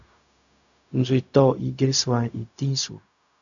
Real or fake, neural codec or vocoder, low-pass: fake; codec, 16 kHz, 0.4 kbps, LongCat-Audio-Codec; 7.2 kHz